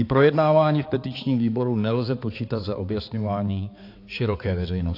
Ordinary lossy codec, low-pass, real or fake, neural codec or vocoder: AAC, 32 kbps; 5.4 kHz; fake; codec, 16 kHz, 4 kbps, X-Codec, HuBERT features, trained on balanced general audio